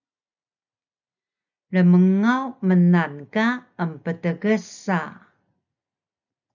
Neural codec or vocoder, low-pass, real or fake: none; 7.2 kHz; real